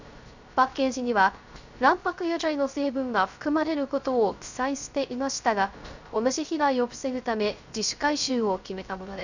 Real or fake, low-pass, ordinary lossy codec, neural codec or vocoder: fake; 7.2 kHz; none; codec, 16 kHz, 0.3 kbps, FocalCodec